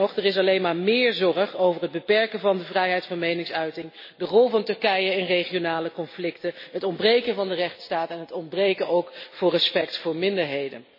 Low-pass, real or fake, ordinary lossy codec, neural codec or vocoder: 5.4 kHz; real; MP3, 24 kbps; none